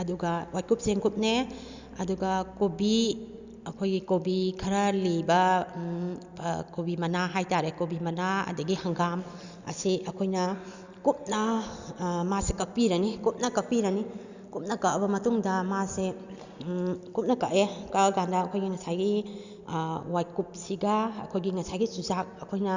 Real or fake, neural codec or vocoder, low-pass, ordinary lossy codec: real; none; 7.2 kHz; Opus, 64 kbps